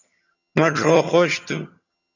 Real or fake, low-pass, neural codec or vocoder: fake; 7.2 kHz; vocoder, 22.05 kHz, 80 mel bands, HiFi-GAN